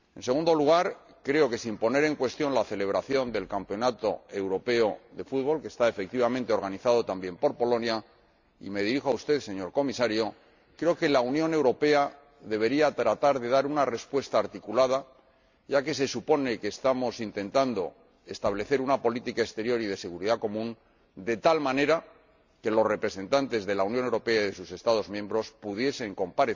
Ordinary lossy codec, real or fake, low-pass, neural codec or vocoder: Opus, 64 kbps; real; 7.2 kHz; none